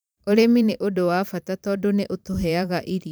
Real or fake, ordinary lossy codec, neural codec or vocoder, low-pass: fake; none; vocoder, 44.1 kHz, 128 mel bands every 512 samples, BigVGAN v2; none